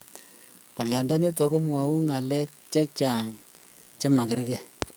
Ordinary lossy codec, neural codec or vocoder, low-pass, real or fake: none; codec, 44.1 kHz, 2.6 kbps, SNAC; none; fake